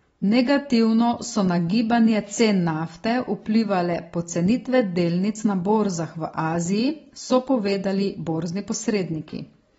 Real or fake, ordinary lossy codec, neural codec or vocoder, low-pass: real; AAC, 24 kbps; none; 19.8 kHz